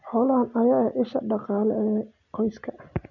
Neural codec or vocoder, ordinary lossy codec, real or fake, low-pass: none; none; real; 7.2 kHz